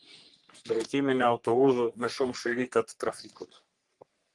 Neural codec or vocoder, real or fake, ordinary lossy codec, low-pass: codec, 44.1 kHz, 3.4 kbps, Pupu-Codec; fake; Opus, 24 kbps; 10.8 kHz